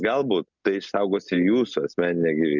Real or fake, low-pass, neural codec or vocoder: real; 7.2 kHz; none